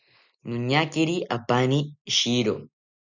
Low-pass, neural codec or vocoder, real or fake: 7.2 kHz; none; real